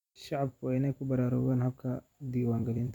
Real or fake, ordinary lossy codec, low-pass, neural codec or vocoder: fake; none; 19.8 kHz; vocoder, 44.1 kHz, 128 mel bands every 256 samples, BigVGAN v2